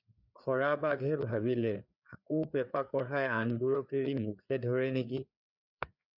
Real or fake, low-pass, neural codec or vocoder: fake; 5.4 kHz; codec, 16 kHz, 4 kbps, FreqCodec, larger model